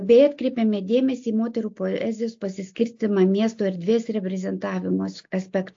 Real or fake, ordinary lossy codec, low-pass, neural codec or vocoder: real; AAC, 48 kbps; 7.2 kHz; none